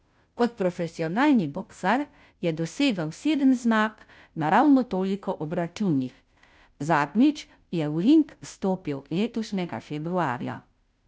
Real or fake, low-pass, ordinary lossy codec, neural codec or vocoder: fake; none; none; codec, 16 kHz, 0.5 kbps, FunCodec, trained on Chinese and English, 25 frames a second